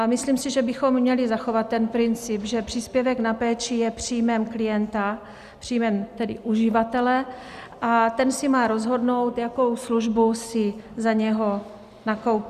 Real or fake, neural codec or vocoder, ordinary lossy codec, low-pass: real; none; Opus, 64 kbps; 14.4 kHz